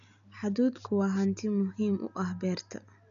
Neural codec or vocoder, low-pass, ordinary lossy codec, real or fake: none; 7.2 kHz; none; real